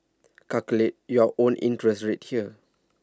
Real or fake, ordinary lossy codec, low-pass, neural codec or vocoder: real; none; none; none